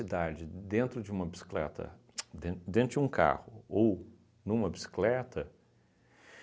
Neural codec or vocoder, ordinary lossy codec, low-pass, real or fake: none; none; none; real